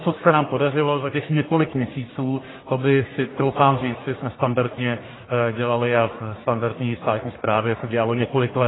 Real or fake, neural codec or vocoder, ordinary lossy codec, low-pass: fake; codec, 44.1 kHz, 1.7 kbps, Pupu-Codec; AAC, 16 kbps; 7.2 kHz